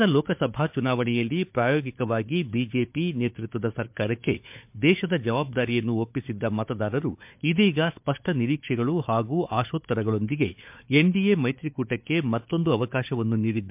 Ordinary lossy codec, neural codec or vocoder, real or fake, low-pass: MP3, 32 kbps; codec, 16 kHz, 4 kbps, FunCodec, trained on Chinese and English, 50 frames a second; fake; 3.6 kHz